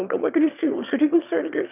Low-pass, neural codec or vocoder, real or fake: 3.6 kHz; autoencoder, 22.05 kHz, a latent of 192 numbers a frame, VITS, trained on one speaker; fake